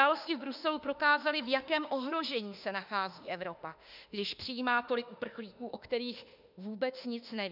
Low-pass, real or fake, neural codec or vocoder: 5.4 kHz; fake; autoencoder, 48 kHz, 32 numbers a frame, DAC-VAE, trained on Japanese speech